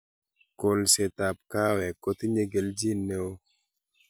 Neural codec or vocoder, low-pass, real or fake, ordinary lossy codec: none; none; real; none